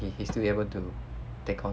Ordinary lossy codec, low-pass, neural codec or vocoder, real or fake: none; none; none; real